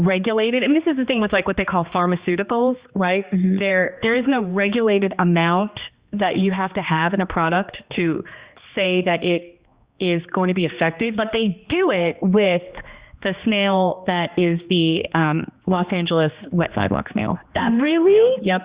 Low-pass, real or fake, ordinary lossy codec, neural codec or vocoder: 3.6 kHz; fake; Opus, 64 kbps; codec, 16 kHz, 2 kbps, X-Codec, HuBERT features, trained on general audio